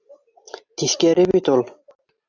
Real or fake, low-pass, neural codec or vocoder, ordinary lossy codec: real; 7.2 kHz; none; AAC, 48 kbps